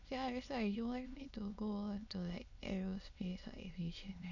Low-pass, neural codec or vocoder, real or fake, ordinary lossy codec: 7.2 kHz; autoencoder, 22.05 kHz, a latent of 192 numbers a frame, VITS, trained on many speakers; fake; none